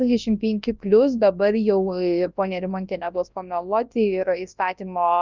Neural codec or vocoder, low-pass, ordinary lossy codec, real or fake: codec, 24 kHz, 0.9 kbps, WavTokenizer, large speech release; 7.2 kHz; Opus, 24 kbps; fake